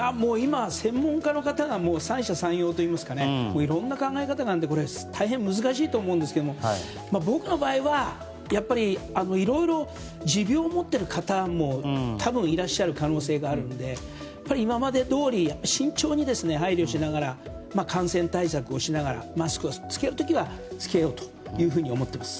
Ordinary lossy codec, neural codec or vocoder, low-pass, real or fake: none; none; none; real